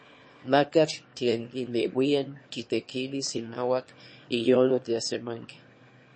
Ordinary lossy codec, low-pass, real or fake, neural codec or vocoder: MP3, 32 kbps; 9.9 kHz; fake; autoencoder, 22.05 kHz, a latent of 192 numbers a frame, VITS, trained on one speaker